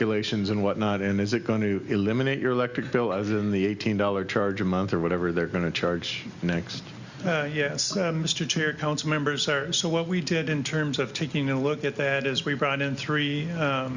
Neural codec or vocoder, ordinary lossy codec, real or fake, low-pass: none; Opus, 64 kbps; real; 7.2 kHz